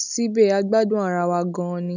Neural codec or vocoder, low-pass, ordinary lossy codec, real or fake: none; 7.2 kHz; none; real